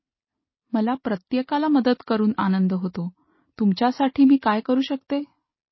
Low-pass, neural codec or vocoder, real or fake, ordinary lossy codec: 7.2 kHz; none; real; MP3, 24 kbps